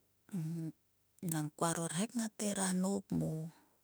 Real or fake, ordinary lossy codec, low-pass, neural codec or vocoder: fake; none; none; autoencoder, 48 kHz, 32 numbers a frame, DAC-VAE, trained on Japanese speech